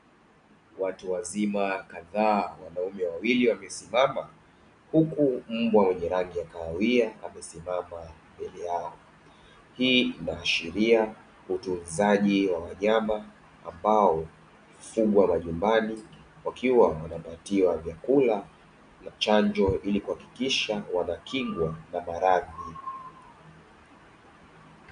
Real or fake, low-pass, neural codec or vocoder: real; 9.9 kHz; none